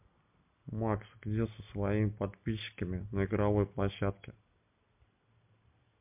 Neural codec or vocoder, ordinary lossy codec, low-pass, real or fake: none; MP3, 32 kbps; 3.6 kHz; real